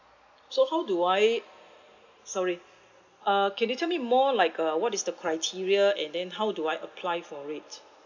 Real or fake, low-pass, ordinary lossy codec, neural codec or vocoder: real; 7.2 kHz; none; none